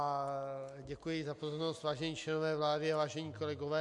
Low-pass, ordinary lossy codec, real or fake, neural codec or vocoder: 10.8 kHz; MP3, 64 kbps; real; none